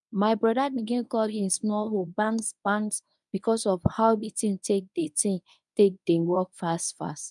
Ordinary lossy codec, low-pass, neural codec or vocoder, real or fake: none; 10.8 kHz; codec, 24 kHz, 0.9 kbps, WavTokenizer, medium speech release version 1; fake